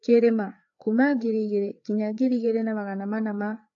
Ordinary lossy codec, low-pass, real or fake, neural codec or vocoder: AAC, 48 kbps; 7.2 kHz; fake; codec, 16 kHz, 4 kbps, FreqCodec, larger model